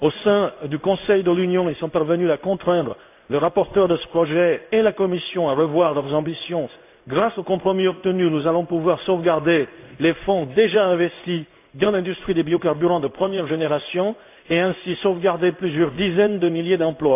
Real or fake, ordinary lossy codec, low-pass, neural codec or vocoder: fake; AAC, 32 kbps; 3.6 kHz; codec, 16 kHz in and 24 kHz out, 1 kbps, XY-Tokenizer